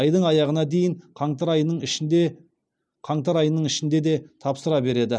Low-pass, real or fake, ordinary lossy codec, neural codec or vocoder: 9.9 kHz; real; none; none